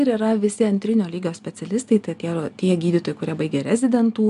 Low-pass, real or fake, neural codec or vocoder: 10.8 kHz; real; none